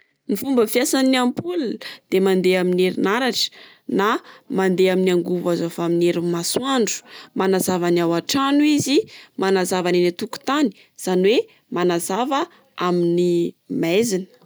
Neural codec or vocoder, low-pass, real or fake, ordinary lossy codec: none; none; real; none